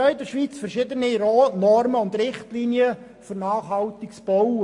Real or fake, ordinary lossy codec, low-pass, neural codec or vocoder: real; MP3, 48 kbps; 10.8 kHz; none